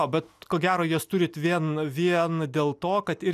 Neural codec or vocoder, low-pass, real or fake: none; 14.4 kHz; real